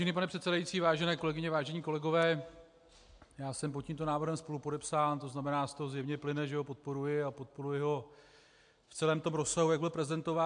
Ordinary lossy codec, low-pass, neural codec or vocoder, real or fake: MP3, 64 kbps; 9.9 kHz; none; real